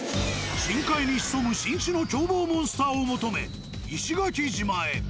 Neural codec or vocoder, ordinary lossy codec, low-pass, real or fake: none; none; none; real